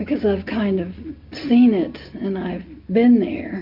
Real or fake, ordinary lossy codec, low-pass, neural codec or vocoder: real; MP3, 48 kbps; 5.4 kHz; none